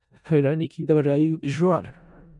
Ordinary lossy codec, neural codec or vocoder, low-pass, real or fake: MP3, 96 kbps; codec, 16 kHz in and 24 kHz out, 0.4 kbps, LongCat-Audio-Codec, four codebook decoder; 10.8 kHz; fake